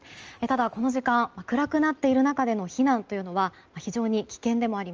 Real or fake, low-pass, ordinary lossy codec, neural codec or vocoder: real; 7.2 kHz; Opus, 24 kbps; none